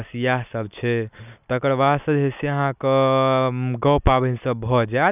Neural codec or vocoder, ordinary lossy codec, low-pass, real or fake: none; none; 3.6 kHz; real